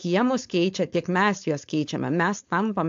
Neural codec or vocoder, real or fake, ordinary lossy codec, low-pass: codec, 16 kHz, 4.8 kbps, FACodec; fake; AAC, 64 kbps; 7.2 kHz